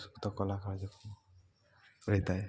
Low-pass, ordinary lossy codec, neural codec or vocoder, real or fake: none; none; none; real